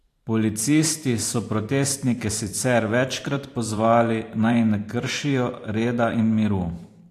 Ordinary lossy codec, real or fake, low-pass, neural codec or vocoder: AAC, 64 kbps; fake; 14.4 kHz; vocoder, 44.1 kHz, 128 mel bands every 512 samples, BigVGAN v2